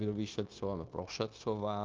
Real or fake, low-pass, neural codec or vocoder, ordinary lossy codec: fake; 7.2 kHz; codec, 16 kHz, 0.9 kbps, LongCat-Audio-Codec; Opus, 24 kbps